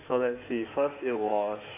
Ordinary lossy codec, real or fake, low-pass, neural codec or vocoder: AAC, 24 kbps; fake; 3.6 kHz; codec, 16 kHz in and 24 kHz out, 2.2 kbps, FireRedTTS-2 codec